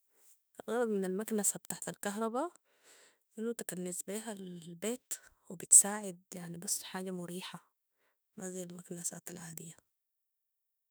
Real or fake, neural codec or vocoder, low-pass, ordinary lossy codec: fake; autoencoder, 48 kHz, 32 numbers a frame, DAC-VAE, trained on Japanese speech; none; none